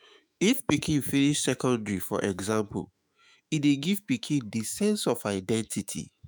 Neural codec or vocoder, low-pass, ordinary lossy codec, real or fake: autoencoder, 48 kHz, 128 numbers a frame, DAC-VAE, trained on Japanese speech; none; none; fake